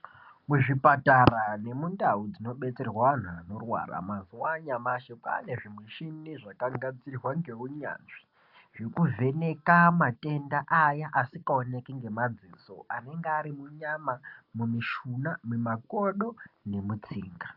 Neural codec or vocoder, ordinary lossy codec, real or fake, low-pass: none; AAC, 48 kbps; real; 5.4 kHz